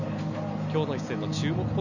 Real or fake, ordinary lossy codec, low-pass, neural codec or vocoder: real; none; 7.2 kHz; none